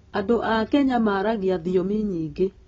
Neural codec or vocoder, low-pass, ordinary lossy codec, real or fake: none; 7.2 kHz; AAC, 24 kbps; real